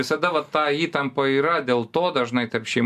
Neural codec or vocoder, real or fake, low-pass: none; real; 14.4 kHz